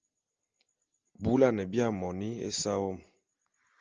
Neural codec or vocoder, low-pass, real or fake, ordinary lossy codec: none; 7.2 kHz; real; Opus, 24 kbps